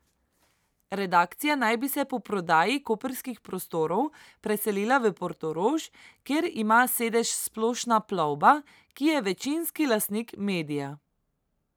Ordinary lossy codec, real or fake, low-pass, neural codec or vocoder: none; real; none; none